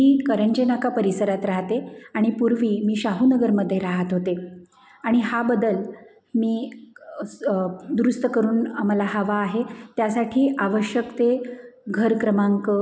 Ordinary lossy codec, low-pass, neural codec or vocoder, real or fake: none; none; none; real